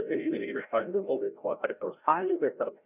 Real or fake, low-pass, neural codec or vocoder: fake; 3.6 kHz; codec, 16 kHz, 0.5 kbps, FreqCodec, larger model